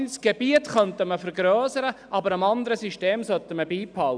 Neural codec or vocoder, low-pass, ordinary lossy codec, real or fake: none; 9.9 kHz; none; real